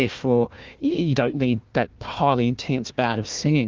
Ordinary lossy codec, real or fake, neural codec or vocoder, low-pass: Opus, 24 kbps; fake; codec, 16 kHz, 1 kbps, FunCodec, trained on Chinese and English, 50 frames a second; 7.2 kHz